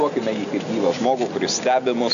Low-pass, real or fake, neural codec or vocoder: 7.2 kHz; real; none